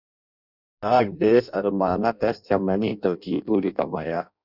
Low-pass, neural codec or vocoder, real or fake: 5.4 kHz; codec, 16 kHz in and 24 kHz out, 0.6 kbps, FireRedTTS-2 codec; fake